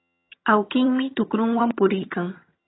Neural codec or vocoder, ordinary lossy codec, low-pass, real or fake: vocoder, 22.05 kHz, 80 mel bands, HiFi-GAN; AAC, 16 kbps; 7.2 kHz; fake